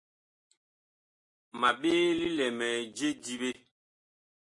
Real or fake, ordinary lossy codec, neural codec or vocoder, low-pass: real; MP3, 48 kbps; none; 10.8 kHz